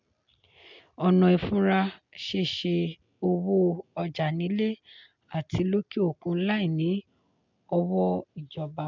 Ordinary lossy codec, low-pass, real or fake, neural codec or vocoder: MP3, 64 kbps; 7.2 kHz; real; none